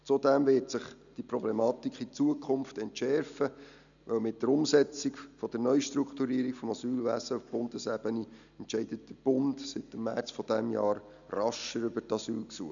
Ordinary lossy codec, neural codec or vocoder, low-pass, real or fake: none; none; 7.2 kHz; real